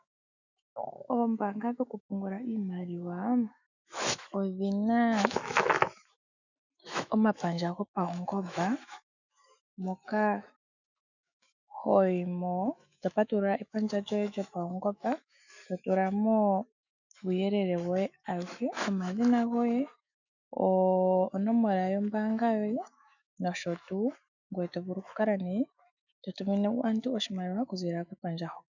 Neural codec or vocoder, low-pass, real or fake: autoencoder, 48 kHz, 128 numbers a frame, DAC-VAE, trained on Japanese speech; 7.2 kHz; fake